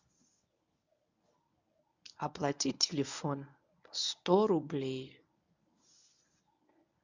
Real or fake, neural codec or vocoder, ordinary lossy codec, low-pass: fake; codec, 24 kHz, 0.9 kbps, WavTokenizer, medium speech release version 1; none; 7.2 kHz